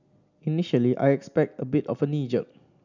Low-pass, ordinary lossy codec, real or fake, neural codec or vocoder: 7.2 kHz; none; real; none